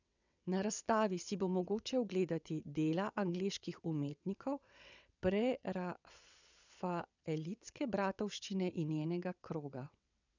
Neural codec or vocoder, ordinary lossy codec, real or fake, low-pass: vocoder, 22.05 kHz, 80 mel bands, WaveNeXt; none; fake; 7.2 kHz